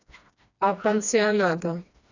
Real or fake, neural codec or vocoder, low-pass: fake; codec, 16 kHz, 2 kbps, FreqCodec, smaller model; 7.2 kHz